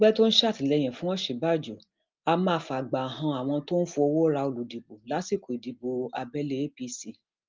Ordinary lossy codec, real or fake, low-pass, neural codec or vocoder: Opus, 32 kbps; real; 7.2 kHz; none